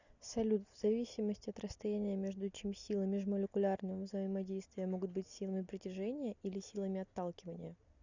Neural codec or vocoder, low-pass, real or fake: none; 7.2 kHz; real